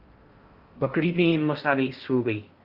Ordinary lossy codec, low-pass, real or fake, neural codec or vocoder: Opus, 32 kbps; 5.4 kHz; fake; codec, 16 kHz in and 24 kHz out, 0.6 kbps, FocalCodec, streaming, 4096 codes